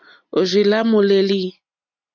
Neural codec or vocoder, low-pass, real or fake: none; 7.2 kHz; real